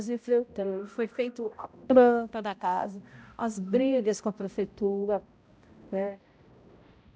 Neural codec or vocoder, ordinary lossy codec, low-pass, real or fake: codec, 16 kHz, 0.5 kbps, X-Codec, HuBERT features, trained on balanced general audio; none; none; fake